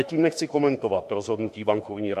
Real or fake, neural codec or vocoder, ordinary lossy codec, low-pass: fake; autoencoder, 48 kHz, 32 numbers a frame, DAC-VAE, trained on Japanese speech; MP3, 64 kbps; 14.4 kHz